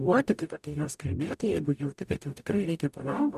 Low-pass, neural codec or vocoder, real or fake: 14.4 kHz; codec, 44.1 kHz, 0.9 kbps, DAC; fake